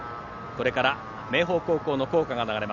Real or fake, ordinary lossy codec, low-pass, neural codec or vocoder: real; none; 7.2 kHz; none